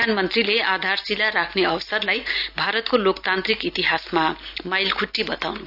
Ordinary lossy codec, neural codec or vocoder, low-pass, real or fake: AAC, 48 kbps; none; 5.4 kHz; real